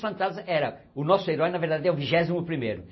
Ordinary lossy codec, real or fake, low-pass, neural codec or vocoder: MP3, 24 kbps; real; 7.2 kHz; none